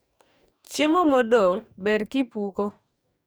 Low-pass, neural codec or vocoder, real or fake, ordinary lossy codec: none; codec, 44.1 kHz, 2.6 kbps, DAC; fake; none